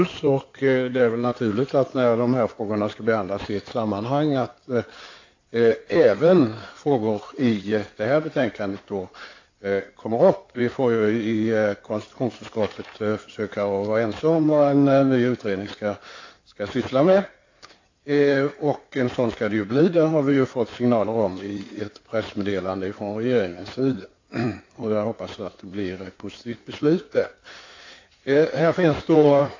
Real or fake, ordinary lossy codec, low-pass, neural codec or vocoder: fake; AAC, 48 kbps; 7.2 kHz; codec, 16 kHz in and 24 kHz out, 2.2 kbps, FireRedTTS-2 codec